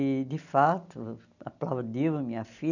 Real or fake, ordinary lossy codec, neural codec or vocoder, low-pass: real; none; none; 7.2 kHz